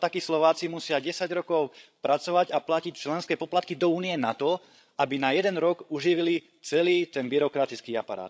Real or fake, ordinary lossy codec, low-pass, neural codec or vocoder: fake; none; none; codec, 16 kHz, 16 kbps, FreqCodec, larger model